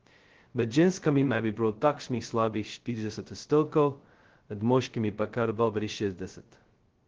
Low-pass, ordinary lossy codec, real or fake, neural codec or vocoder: 7.2 kHz; Opus, 16 kbps; fake; codec, 16 kHz, 0.2 kbps, FocalCodec